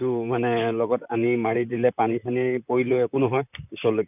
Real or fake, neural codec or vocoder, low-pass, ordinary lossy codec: fake; vocoder, 44.1 kHz, 128 mel bands, Pupu-Vocoder; 3.6 kHz; none